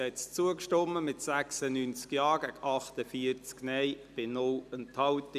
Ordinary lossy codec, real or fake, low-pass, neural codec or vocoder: none; real; 14.4 kHz; none